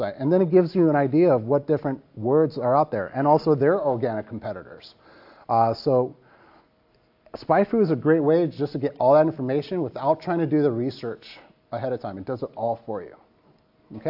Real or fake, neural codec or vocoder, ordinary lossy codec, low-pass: real; none; AAC, 48 kbps; 5.4 kHz